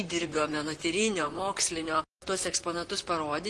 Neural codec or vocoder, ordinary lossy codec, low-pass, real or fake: vocoder, 44.1 kHz, 128 mel bands, Pupu-Vocoder; Opus, 24 kbps; 10.8 kHz; fake